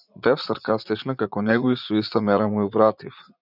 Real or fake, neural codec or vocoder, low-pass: fake; vocoder, 44.1 kHz, 80 mel bands, Vocos; 5.4 kHz